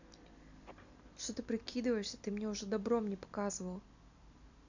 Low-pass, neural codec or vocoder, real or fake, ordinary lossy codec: 7.2 kHz; none; real; none